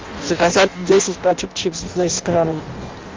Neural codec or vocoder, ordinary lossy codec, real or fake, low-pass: codec, 16 kHz in and 24 kHz out, 0.6 kbps, FireRedTTS-2 codec; Opus, 32 kbps; fake; 7.2 kHz